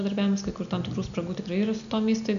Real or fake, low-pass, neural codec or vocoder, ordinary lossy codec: real; 7.2 kHz; none; MP3, 96 kbps